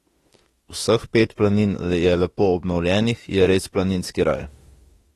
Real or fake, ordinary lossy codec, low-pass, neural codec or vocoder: fake; AAC, 32 kbps; 19.8 kHz; autoencoder, 48 kHz, 32 numbers a frame, DAC-VAE, trained on Japanese speech